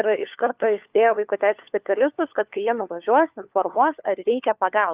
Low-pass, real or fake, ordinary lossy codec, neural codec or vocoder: 3.6 kHz; fake; Opus, 24 kbps; codec, 16 kHz, 4 kbps, FunCodec, trained on LibriTTS, 50 frames a second